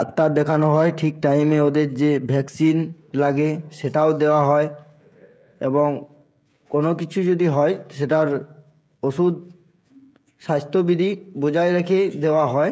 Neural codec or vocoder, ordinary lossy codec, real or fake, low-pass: codec, 16 kHz, 16 kbps, FreqCodec, smaller model; none; fake; none